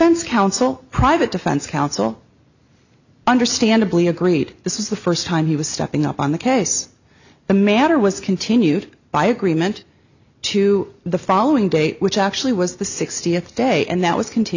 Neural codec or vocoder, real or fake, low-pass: none; real; 7.2 kHz